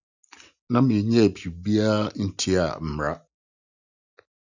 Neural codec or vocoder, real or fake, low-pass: none; real; 7.2 kHz